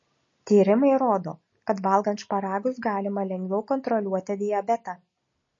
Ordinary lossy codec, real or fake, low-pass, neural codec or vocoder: MP3, 32 kbps; real; 7.2 kHz; none